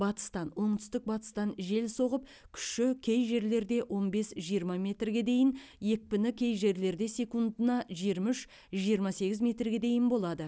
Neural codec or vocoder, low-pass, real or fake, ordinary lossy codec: none; none; real; none